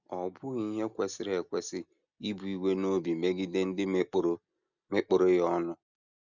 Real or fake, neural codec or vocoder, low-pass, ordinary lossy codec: real; none; 7.2 kHz; none